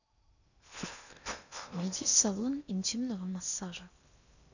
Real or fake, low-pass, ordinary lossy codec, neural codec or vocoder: fake; 7.2 kHz; none; codec, 16 kHz in and 24 kHz out, 0.8 kbps, FocalCodec, streaming, 65536 codes